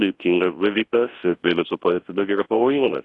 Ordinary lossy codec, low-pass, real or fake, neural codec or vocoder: AAC, 32 kbps; 10.8 kHz; fake; codec, 24 kHz, 0.9 kbps, WavTokenizer, large speech release